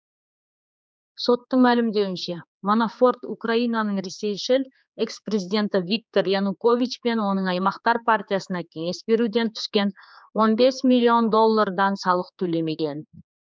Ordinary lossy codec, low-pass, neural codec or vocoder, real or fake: none; none; codec, 16 kHz, 4 kbps, X-Codec, HuBERT features, trained on general audio; fake